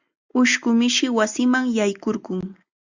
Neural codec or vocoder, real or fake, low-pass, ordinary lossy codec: none; real; 7.2 kHz; Opus, 64 kbps